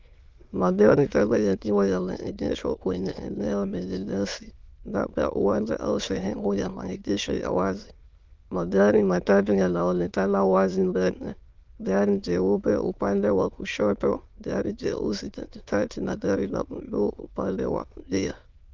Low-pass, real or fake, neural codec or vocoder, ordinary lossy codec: 7.2 kHz; fake; autoencoder, 22.05 kHz, a latent of 192 numbers a frame, VITS, trained on many speakers; Opus, 32 kbps